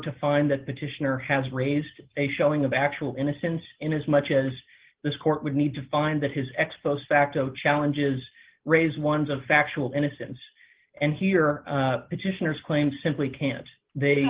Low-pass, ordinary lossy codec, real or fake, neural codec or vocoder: 3.6 kHz; Opus, 16 kbps; real; none